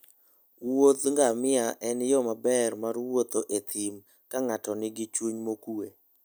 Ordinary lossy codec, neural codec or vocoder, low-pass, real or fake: none; none; none; real